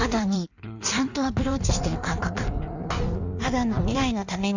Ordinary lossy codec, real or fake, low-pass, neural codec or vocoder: none; fake; 7.2 kHz; codec, 16 kHz in and 24 kHz out, 1.1 kbps, FireRedTTS-2 codec